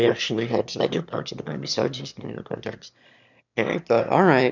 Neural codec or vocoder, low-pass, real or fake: autoencoder, 22.05 kHz, a latent of 192 numbers a frame, VITS, trained on one speaker; 7.2 kHz; fake